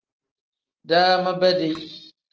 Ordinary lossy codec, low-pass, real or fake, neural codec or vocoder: Opus, 16 kbps; 7.2 kHz; real; none